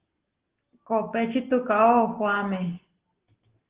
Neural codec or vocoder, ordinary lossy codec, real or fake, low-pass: none; Opus, 16 kbps; real; 3.6 kHz